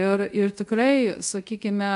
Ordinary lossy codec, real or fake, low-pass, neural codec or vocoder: AAC, 96 kbps; fake; 10.8 kHz; codec, 24 kHz, 0.5 kbps, DualCodec